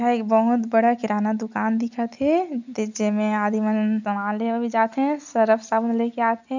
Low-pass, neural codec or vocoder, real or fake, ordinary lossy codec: 7.2 kHz; none; real; none